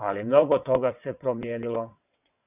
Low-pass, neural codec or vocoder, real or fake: 3.6 kHz; vocoder, 22.05 kHz, 80 mel bands, WaveNeXt; fake